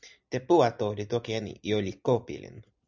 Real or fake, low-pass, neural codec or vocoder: real; 7.2 kHz; none